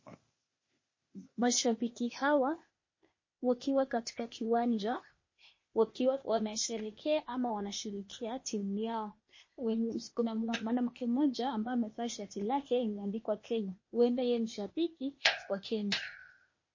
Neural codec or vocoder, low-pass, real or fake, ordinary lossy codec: codec, 16 kHz, 0.8 kbps, ZipCodec; 7.2 kHz; fake; MP3, 32 kbps